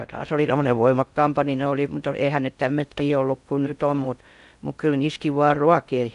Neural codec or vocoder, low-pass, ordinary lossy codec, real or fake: codec, 16 kHz in and 24 kHz out, 0.6 kbps, FocalCodec, streaming, 4096 codes; 10.8 kHz; none; fake